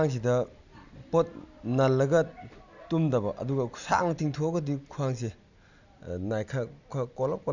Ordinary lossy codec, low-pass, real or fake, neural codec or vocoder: none; 7.2 kHz; real; none